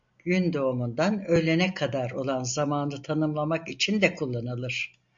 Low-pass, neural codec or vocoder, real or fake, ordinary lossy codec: 7.2 kHz; none; real; MP3, 48 kbps